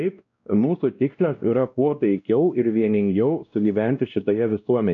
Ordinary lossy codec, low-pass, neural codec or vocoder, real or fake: AAC, 64 kbps; 7.2 kHz; codec, 16 kHz, 1 kbps, X-Codec, WavLM features, trained on Multilingual LibriSpeech; fake